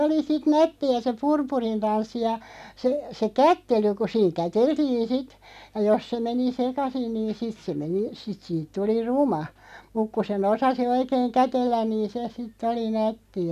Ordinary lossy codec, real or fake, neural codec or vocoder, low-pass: none; real; none; 14.4 kHz